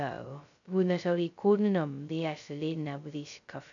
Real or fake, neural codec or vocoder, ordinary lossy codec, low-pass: fake; codec, 16 kHz, 0.2 kbps, FocalCodec; none; 7.2 kHz